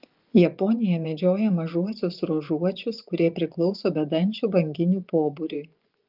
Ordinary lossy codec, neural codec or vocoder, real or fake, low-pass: Opus, 32 kbps; vocoder, 24 kHz, 100 mel bands, Vocos; fake; 5.4 kHz